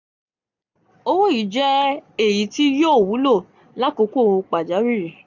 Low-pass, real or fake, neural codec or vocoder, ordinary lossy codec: 7.2 kHz; real; none; none